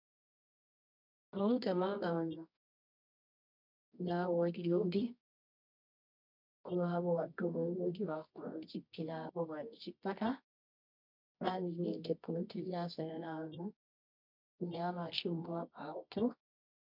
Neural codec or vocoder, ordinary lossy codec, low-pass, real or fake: codec, 24 kHz, 0.9 kbps, WavTokenizer, medium music audio release; MP3, 48 kbps; 5.4 kHz; fake